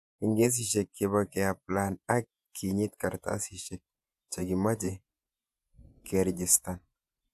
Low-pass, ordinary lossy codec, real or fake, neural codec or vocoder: 14.4 kHz; AAC, 96 kbps; fake; vocoder, 48 kHz, 128 mel bands, Vocos